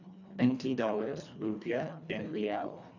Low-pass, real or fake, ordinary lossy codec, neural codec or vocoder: 7.2 kHz; fake; none; codec, 24 kHz, 1.5 kbps, HILCodec